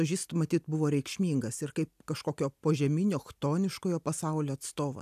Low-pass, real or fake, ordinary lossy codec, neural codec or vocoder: 14.4 kHz; real; AAC, 96 kbps; none